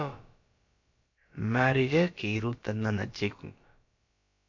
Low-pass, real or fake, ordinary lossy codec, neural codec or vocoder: 7.2 kHz; fake; MP3, 48 kbps; codec, 16 kHz, about 1 kbps, DyCAST, with the encoder's durations